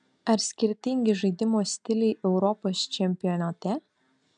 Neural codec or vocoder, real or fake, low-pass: none; real; 9.9 kHz